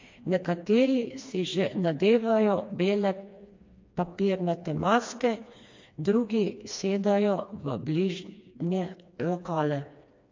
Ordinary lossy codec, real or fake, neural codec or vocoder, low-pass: MP3, 48 kbps; fake; codec, 16 kHz, 2 kbps, FreqCodec, smaller model; 7.2 kHz